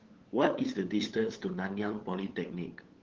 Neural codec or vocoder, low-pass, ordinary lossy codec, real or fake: codec, 16 kHz, 8 kbps, FunCodec, trained on LibriTTS, 25 frames a second; 7.2 kHz; Opus, 16 kbps; fake